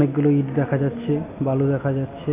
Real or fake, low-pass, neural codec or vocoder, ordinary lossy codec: real; 3.6 kHz; none; AAC, 16 kbps